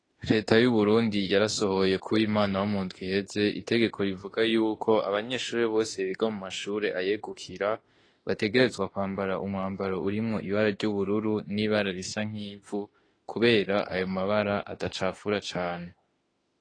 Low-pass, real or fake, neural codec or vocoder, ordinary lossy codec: 9.9 kHz; fake; autoencoder, 48 kHz, 32 numbers a frame, DAC-VAE, trained on Japanese speech; AAC, 32 kbps